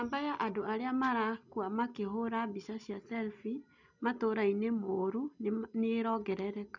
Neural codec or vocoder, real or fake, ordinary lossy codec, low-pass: none; real; none; 7.2 kHz